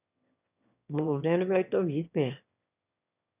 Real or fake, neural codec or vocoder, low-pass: fake; autoencoder, 22.05 kHz, a latent of 192 numbers a frame, VITS, trained on one speaker; 3.6 kHz